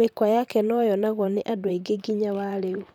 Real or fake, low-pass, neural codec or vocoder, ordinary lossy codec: fake; 19.8 kHz; vocoder, 44.1 kHz, 128 mel bands, Pupu-Vocoder; none